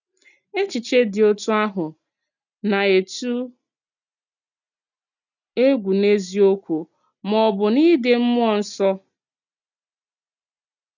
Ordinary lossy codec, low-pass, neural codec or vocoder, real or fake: none; 7.2 kHz; none; real